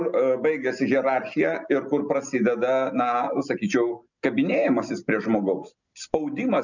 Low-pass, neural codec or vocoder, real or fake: 7.2 kHz; none; real